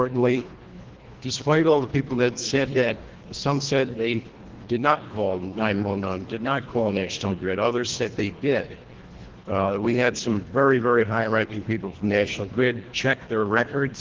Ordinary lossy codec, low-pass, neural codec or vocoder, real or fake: Opus, 16 kbps; 7.2 kHz; codec, 24 kHz, 1.5 kbps, HILCodec; fake